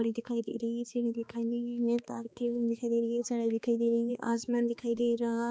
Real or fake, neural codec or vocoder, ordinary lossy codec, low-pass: fake; codec, 16 kHz, 2 kbps, X-Codec, HuBERT features, trained on balanced general audio; none; none